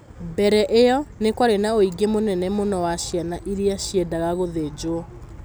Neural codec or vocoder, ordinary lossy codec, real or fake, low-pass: none; none; real; none